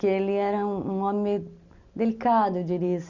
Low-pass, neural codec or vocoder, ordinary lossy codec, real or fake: 7.2 kHz; none; none; real